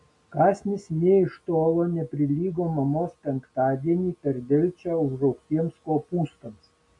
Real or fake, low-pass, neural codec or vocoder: real; 10.8 kHz; none